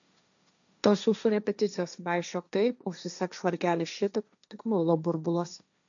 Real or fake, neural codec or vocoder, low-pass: fake; codec, 16 kHz, 1.1 kbps, Voila-Tokenizer; 7.2 kHz